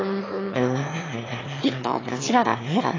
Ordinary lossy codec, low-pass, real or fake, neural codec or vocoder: AAC, 32 kbps; 7.2 kHz; fake; autoencoder, 22.05 kHz, a latent of 192 numbers a frame, VITS, trained on one speaker